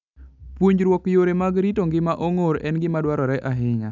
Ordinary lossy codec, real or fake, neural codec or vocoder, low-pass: none; real; none; 7.2 kHz